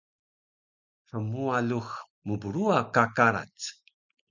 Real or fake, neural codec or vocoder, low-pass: real; none; 7.2 kHz